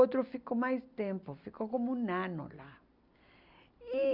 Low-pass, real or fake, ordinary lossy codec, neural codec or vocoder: 5.4 kHz; real; Opus, 64 kbps; none